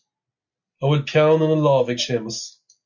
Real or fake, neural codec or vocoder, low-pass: real; none; 7.2 kHz